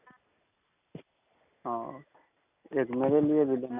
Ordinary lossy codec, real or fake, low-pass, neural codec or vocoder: none; real; 3.6 kHz; none